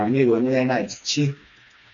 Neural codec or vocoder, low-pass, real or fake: codec, 16 kHz, 2 kbps, FreqCodec, smaller model; 7.2 kHz; fake